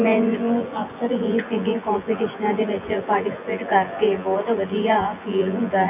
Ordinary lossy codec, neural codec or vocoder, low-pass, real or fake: none; vocoder, 24 kHz, 100 mel bands, Vocos; 3.6 kHz; fake